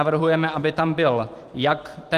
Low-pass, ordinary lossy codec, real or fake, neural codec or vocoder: 14.4 kHz; Opus, 24 kbps; fake; vocoder, 44.1 kHz, 128 mel bands every 512 samples, BigVGAN v2